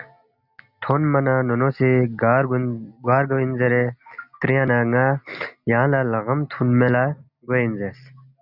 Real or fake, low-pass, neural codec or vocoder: real; 5.4 kHz; none